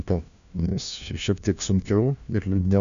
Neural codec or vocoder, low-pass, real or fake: codec, 16 kHz, 1 kbps, FunCodec, trained on LibriTTS, 50 frames a second; 7.2 kHz; fake